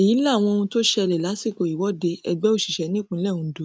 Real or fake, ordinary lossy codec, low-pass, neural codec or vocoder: real; none; none; none